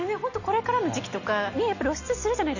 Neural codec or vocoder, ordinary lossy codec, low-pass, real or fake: none; none; 7.2 kHz; real